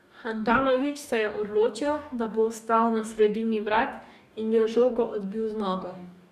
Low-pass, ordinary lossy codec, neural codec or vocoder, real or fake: 14.4 kHz; none; codec, 44.1 kHz, 2.6 kbps, DAC; fake